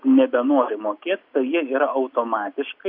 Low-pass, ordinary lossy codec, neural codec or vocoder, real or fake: 5.4 kHz; MP3, 48 kbps; none; real